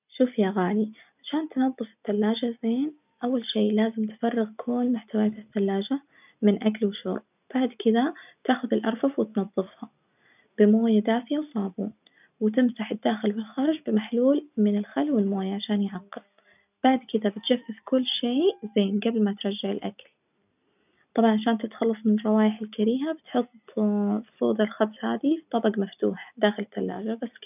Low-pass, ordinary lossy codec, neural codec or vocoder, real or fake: 3.6 kHz; none; none; real